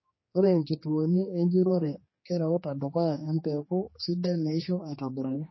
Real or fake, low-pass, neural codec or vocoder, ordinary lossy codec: fake; 7.2 kHz; codec, 16 kHz, 2 kbps, X-Codec, HuBERT features, trained on general audio; MP3, 24 kbps